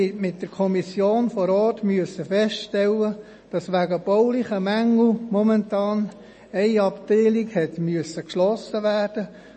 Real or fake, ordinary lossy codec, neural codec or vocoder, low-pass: real; MP3, 32 kbps; none; 10.8 kHz